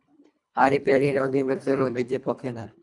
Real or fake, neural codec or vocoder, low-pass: fake; codec, 24 kHz, 1.5 kbps, HILCodec; 10.8 kHz